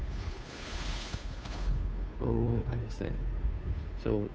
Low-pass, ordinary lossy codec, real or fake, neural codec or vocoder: none; none; fake; codec, 16 kHz, 2 kbps, FunCodec, trained on Chinese and English, 25 frames a second